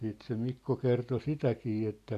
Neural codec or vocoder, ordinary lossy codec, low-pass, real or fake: none; none; 14.4 kHz; real